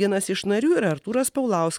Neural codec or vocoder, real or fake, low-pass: none; real; 19.8 kHz